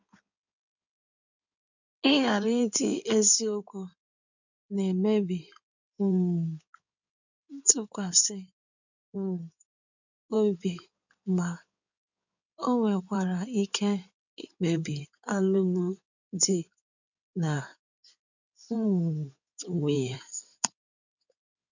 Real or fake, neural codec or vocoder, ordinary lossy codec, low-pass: fake; codec, 16 kHz in and 24 kHz out, 2.2 kbps, FireRedTTS-2 codec; none; 7.2 kHz